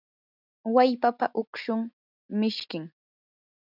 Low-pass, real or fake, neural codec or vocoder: 5.4 kHz; real; none